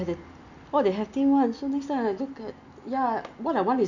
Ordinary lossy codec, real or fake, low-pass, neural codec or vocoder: none; real; 7.2 kHz; none